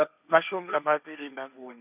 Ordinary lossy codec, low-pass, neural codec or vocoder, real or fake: none; 3.6 kHz; codec, 16 kHz, 1.1 kbps, Voila-Tokenizer; fake